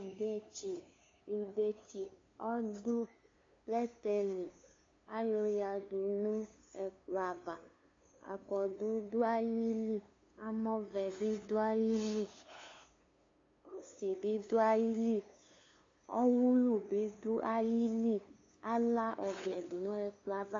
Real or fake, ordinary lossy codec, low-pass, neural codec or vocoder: fake; AAC, 32 kbps; 7.2 kHz; codec, 16 kHz, 2 kbps, FunCodec, trained on LibriTTS, 25 frames a second